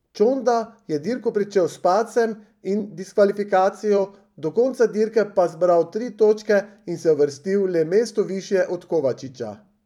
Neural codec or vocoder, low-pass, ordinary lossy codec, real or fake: vocoder, 44.1 kHz, 128 mel bands every 256 samples, BigVGAN v2; 19.8 kHz; none; fake